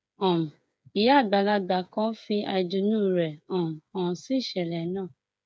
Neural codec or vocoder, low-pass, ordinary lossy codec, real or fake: codec, 16 kHz, 8 kbps, FreqCodec, smaller model; none; none; fake